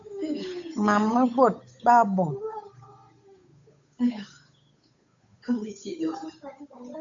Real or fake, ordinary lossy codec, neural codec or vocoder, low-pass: fake; AAC, 64 kbps; codec, 16 kHz, 8 kbps, FunCodec, trained on Chinese and English, 25 frames a second; 7.2 kHz